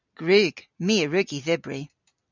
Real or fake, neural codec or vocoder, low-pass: real; none; 7.2 kHz